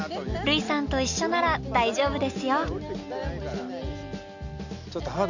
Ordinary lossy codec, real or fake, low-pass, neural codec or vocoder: none; real; 7.2 kHz; none